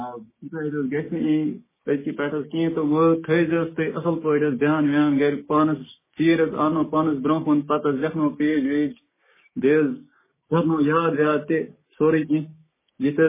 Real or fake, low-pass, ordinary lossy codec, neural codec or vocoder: fake; 3.6 kHz; MP3, 16 kbps; codec, 16 kHz, 6 kbps, DAC